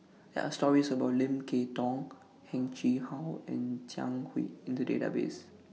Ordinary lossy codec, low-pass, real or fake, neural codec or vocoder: none; none; real; none